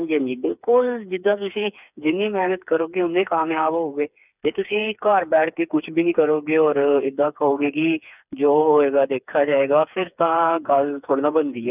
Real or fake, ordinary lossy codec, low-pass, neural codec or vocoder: fake; none; 3.6 kHz; codec, 16 kHz, 4 kbps, FreqCodec, smaller model